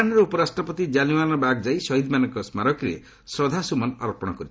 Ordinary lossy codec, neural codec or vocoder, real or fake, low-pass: none; none; real; none